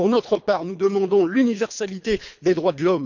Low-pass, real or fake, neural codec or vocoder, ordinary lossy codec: 7.2 kHz; fake; codec, 24 kHz, 3 kbps, HILCodec; none